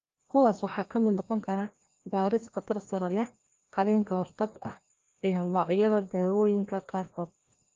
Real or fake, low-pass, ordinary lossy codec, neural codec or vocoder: fake; 7.2 kHz; Opus, 32 kbps; codec, 16 kHz, 1 kbps, FreqCodec, larger model